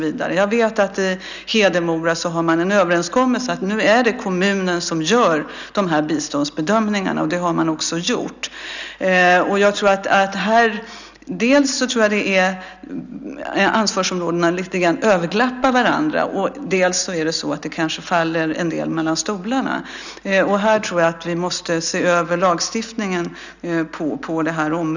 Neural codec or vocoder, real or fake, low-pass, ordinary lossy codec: none; real; 7.2 kHz; none